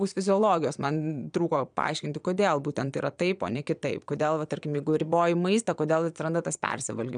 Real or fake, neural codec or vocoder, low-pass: fake; vocoder, 22.05 kHz, 80 mel bands, Vocos; 9.9 kHz